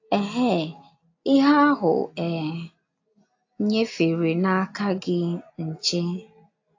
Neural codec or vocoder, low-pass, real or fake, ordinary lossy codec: none; 7.2 kHz; real; AAC, 48 kbps